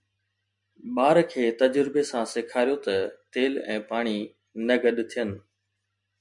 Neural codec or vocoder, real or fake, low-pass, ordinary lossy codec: none; real; 10.8 kHz; MP3, 64 kbps